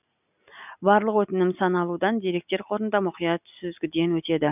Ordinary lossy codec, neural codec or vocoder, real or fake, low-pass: none; none; real; 3.6 kHz